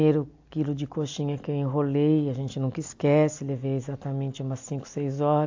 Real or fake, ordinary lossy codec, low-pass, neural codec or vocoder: real; AAC, 48 kbps; 7.2 kHz; none